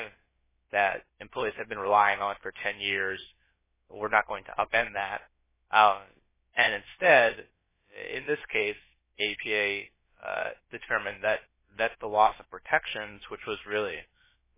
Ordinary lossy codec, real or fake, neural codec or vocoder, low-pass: MP3, 16 kbps; fake; codec, 16 kHz, about 1 kbps, DyCAST, with the encoder's durations; 3.6 kHz